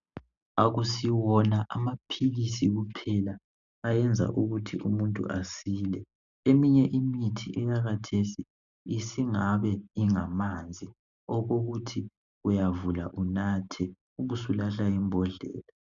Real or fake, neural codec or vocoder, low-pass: real; none; 7.2 kHz